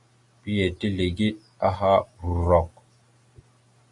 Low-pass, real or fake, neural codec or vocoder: 10.8 kHz; real; none